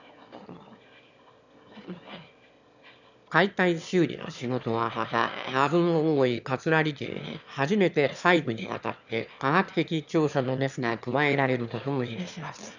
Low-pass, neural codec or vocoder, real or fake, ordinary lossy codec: 7.2 kHz; autoencoder, 22.05 kHz, a latent of 192 numbers a frame, VITS, trained on one speaker; fake; none